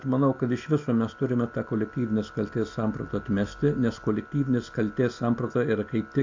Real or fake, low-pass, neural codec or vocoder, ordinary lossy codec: real; 7.2 kHz; none; AAC, 48 kbps